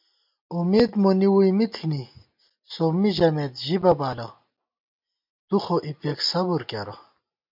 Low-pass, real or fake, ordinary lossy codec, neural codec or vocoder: 5.4 kHz; real; MP3, 48 kbps; none